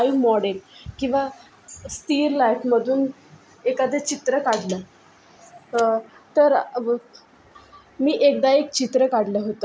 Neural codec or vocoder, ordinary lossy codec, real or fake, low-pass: none; none; real; none